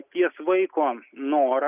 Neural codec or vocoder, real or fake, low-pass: none; real; 3.6 kHz